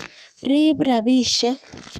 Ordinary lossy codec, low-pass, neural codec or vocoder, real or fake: none; 14.4 kHz; codec, 32 kHz, 1.9 kbps, SNAC; fake